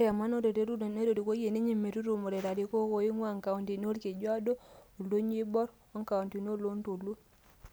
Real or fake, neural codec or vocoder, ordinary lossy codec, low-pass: real; none; none; none